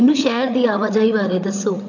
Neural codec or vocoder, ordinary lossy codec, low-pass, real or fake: codec, 16 kHz, 8 kbps, FreqCodec, larger model; none; 7.2 kHz; fake